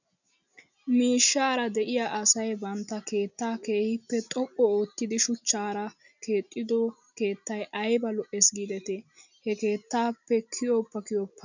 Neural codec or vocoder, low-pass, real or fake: none; 7.2 kHz; real